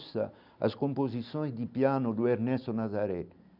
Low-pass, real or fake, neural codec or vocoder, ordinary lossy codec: 5.4 kHz; real; none; none